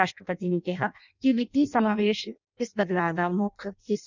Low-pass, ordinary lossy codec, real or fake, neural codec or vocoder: 7.2 kHz; none; fake; codec, 16 kHz in and 24 kHz out, 0.6 kbps, FireRedTTS-2 codec